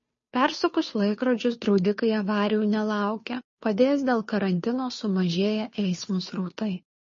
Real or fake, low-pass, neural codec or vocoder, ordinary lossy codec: fake; 7.2 kHz; codec, 16 kHz, 2 kbps, FunCodec, trained on Chinese and English, 25 frames a second; MP3, 32 kbps